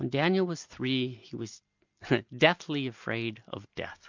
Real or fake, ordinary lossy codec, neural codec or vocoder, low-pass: real; MP3, 64 kbps; none; 7.2 kHz